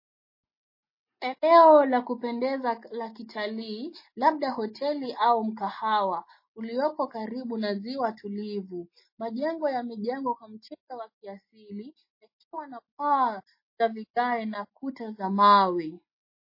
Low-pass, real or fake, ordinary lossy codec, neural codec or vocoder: 5.4 kHz; real; MP3, 24 kbps; none